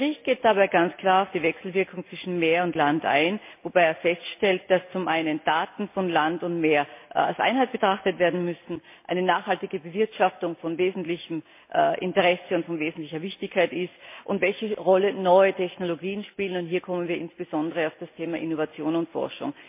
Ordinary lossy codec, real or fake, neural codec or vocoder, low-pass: MP3, 24 kbps; real; none; 3.6 kHz